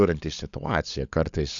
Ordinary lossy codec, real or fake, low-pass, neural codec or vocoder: AAC, 64 kbps; fake; 7.2 kHz; codec, 16 kHz, 16 kbps, FunCodec, trained on LibriTTS, 50 frames a second